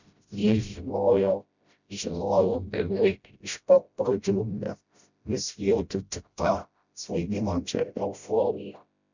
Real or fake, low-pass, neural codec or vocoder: fake; 7.2 kHz; codec, 16 kHz, 0.5 kbps, FreqCodec, smaller model